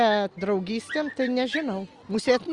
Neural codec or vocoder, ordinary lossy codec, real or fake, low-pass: none; Opus, 32 kbps; real; 10.8 kHz